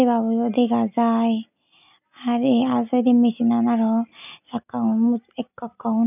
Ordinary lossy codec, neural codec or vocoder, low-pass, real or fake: none; none; 3.6 kHz; real